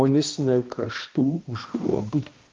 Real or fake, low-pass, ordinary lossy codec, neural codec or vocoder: fake; 7.2 kHz; Opus, 24 kbps; codec, 16 kHz, 1 kbps, X-Codec, HuBERT features, trained on balanced general audio